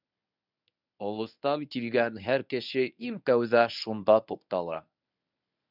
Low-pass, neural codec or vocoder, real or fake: 5.4 kHz; codec, 24 kHz, 0.9 kbps, WavTokenizer, medium speech release version 1; fake